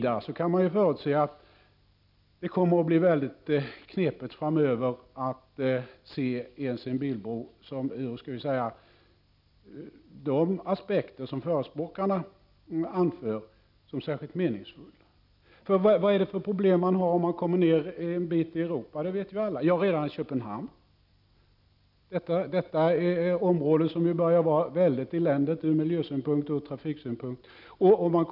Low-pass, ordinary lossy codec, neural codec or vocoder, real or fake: 5.4 kHz; none; none; real